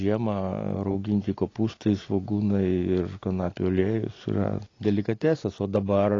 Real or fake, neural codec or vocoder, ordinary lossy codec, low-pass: real; none; AAC, 32 kbps; 7.2 kHz